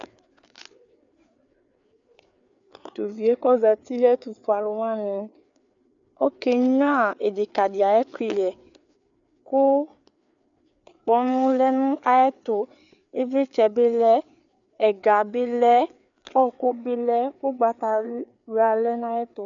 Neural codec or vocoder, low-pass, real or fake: codec, 16 kHz, 4 kbps, FreqCodec, larger model; 7.2 kHz; fake